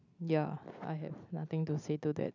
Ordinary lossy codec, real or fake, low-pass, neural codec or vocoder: none; real; 7.2 kHz; none